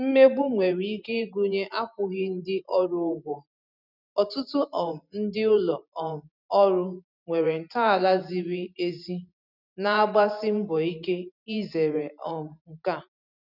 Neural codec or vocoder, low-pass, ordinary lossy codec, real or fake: vocoder, 44.1 kHz, 128 mel bands every 512 samples, BigVGAN v2; 5.4 kHz; none; fake